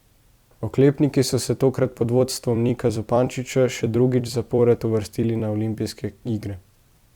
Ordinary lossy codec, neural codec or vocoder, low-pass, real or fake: Opus, 64 kbps; vocoder, 44.1 kHz, 128 mel bands every 256 samples, BigVGAN v2; 19.8 kHz; fake